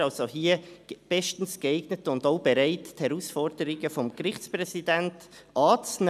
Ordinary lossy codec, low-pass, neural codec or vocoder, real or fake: none; 14.4 kHz; none; real